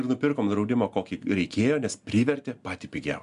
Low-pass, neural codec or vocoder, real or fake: 10.8 kHz; none; real